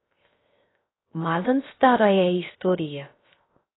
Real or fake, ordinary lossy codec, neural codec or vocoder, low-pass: fake; AAC, 16 kbps; codec, 16 kHz, 0.3 kbps, FocalCodec; 7.2 kHz